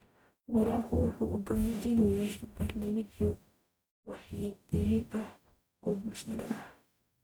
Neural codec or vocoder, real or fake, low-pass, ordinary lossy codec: codec, 44.1 kHz, 0.9 kbps, DAC; fake; none; none